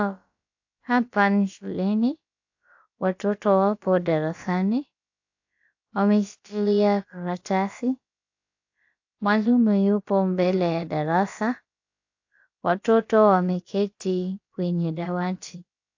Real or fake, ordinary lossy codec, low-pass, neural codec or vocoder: fake; AAC, 48 kbps; 7.2 kHz; codec, 16 kHz, about 1 kbps, DyCAST, with the encoder's durations